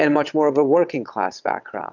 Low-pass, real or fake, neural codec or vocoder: 7.2 kHz; fake; vocoder, 22.05 kHz, 80 mel bands, WaveNeXt